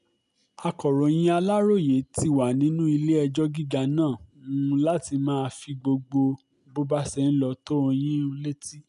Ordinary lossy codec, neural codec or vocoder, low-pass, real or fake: none; none; 10.8 kHz; real